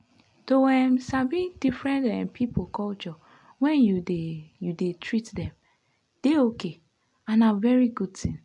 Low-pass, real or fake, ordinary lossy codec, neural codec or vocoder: 10.8 kHz; real; AAC, 64 kbps; none